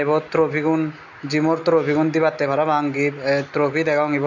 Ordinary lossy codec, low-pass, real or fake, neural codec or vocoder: MP3, 64 kbps; 7.2 kHz; real; none